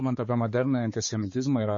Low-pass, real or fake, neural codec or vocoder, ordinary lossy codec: 7.2 kHz; fake; codec, 16 kHz, 4 kbps, X-Codec, HuBERT features, trained on balanced general audio; MP3, 32 kbps